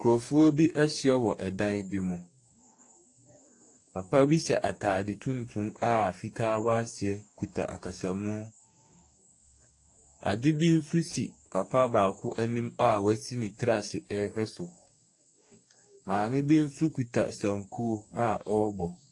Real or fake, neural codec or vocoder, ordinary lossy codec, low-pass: fake; codec, 44.1 kHz, 2.6 kbps, DAC; AAC, 48 kbps; 10.8 kHz